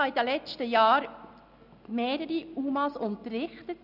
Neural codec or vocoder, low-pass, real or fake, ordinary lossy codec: none; 5.4 kHz; real; none